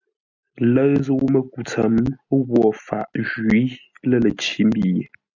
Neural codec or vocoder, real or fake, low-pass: none; real; 7.2 kHz